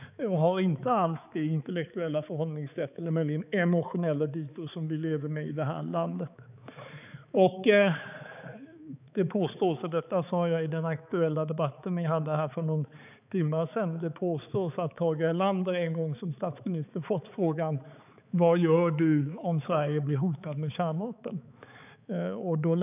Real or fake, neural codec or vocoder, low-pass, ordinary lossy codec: fake; codec, 16 kHz, 4 kbps, X-Codec, HuBERT features, trained on balanced general audio; 3.6 kHz; none